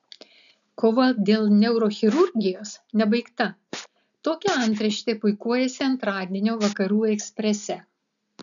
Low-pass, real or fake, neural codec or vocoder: 7.2 kHz; real; none